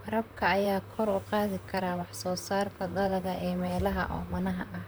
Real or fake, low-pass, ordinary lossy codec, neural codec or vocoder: fake; none; none; vocoder, 44.1 kHz, 128 mel bands, Pupu-Vocoder